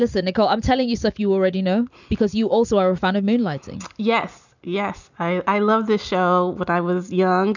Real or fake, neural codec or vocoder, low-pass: real; none; 7.2 kHz